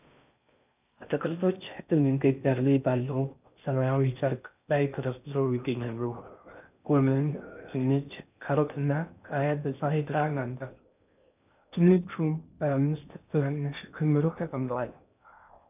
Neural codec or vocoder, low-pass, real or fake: codec, 16 kHz in and 24 kHz out, 0.6 kbps, FocalCodec, streaming, 4096 codes; 3.6 kHz; fake